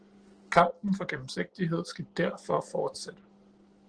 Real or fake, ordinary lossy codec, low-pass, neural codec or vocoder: real; Opus, 16 kbps; 9.9 kHz; none